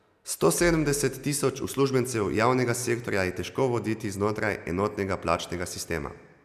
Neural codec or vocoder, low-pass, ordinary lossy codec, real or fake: none; 14.4 kHz; none; real